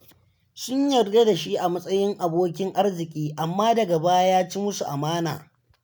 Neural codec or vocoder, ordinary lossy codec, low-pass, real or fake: none; none; none; real